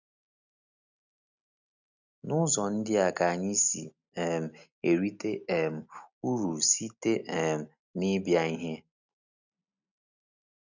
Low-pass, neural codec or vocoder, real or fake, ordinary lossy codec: 7.2 kHz; none; real; none